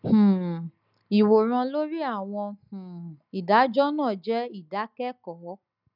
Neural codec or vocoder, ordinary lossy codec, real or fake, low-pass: none; none; real; 5.4 kHz